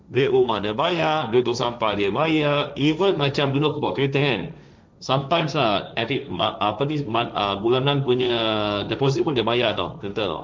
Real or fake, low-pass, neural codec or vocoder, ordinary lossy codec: fake; none; codec, 16 kHz, 1.1 kbps, Voila-Tokenizer; none